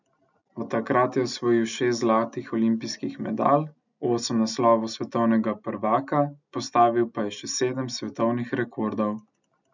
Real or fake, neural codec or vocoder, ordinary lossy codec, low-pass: real; none; none; 7.2 kHz